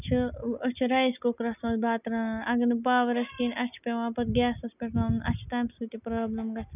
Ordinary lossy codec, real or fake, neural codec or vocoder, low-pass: none; real; none; 3.6 kHz